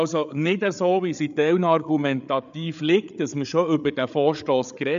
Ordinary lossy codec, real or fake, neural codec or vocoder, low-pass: AAC, 96 kbps; fake; codec, 16 kHz, 8 kbps, FreqCodec, larger model; 7.2 kHz